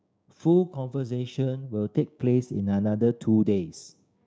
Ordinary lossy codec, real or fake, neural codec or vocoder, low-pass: none; fake; codec, 16 kHz, 6 kbps, DAC; none